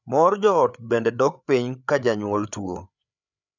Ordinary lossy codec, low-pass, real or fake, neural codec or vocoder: none; 7.2 kHz; fake; codec, 16 kHz, 8 kbps, FreqCodec, larger model